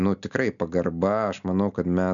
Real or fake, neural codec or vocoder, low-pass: real; none; 7.2 kHz